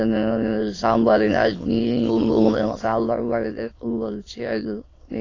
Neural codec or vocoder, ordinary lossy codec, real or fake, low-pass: autoencoder, 22.05 kHz, a latent of 192 numbers a frame, VITS, trained on many speakers; AAC, 32 kbps; fake; 7.2 kHz